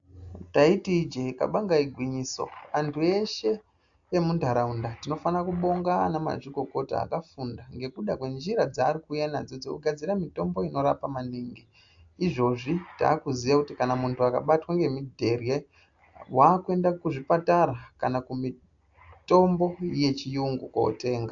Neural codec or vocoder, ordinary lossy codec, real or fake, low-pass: none; AAC, 64 kbps; real; 7.2 kHz